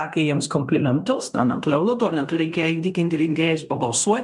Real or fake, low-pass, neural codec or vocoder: fake; 10.8 kHz; codec, 16 kHz in and 24 kHz out, 0.9 kbps, LongCat-Audio-Codec, fine tuned four codebook decoder